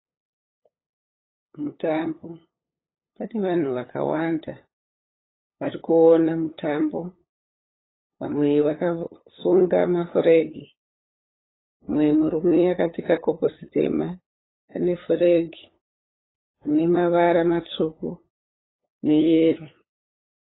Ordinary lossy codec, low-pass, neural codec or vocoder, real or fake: AAC, 16 kbps; 7.2 kHz; codec, 16 kHz, 8 kbps, FunCodec, trained on LibriTTS, 25 frames a second; fake